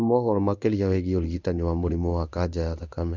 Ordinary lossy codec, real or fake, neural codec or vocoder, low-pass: none; fake; codec, 16 kHz in and 24 kHz out, 1 kbps, XY-Tokenizer; 7.2 kHz